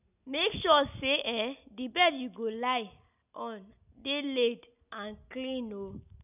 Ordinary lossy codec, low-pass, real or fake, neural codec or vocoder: none; 3.6 kHz; real; none